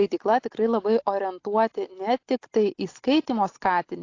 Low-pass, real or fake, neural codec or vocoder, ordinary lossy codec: 7.2 kHz; real; none; AAC, 48 kbps